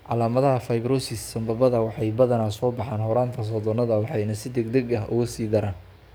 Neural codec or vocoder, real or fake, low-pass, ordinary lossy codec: codec, 44.1 kHz, 7.8 kbps, DAC; fake; none; none